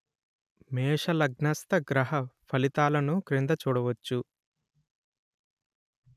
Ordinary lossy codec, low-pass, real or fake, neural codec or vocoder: none; 14.4 kHz; real; none